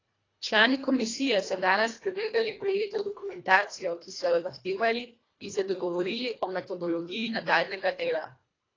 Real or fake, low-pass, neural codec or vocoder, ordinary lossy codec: fake; 7.2 kHz; codec, 24 kHz, 1.5 kbps, HILCodec; AAC, 32 kbps